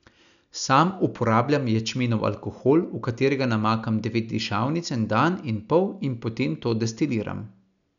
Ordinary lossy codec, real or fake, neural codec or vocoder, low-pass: none; real; none; 7.2 kHz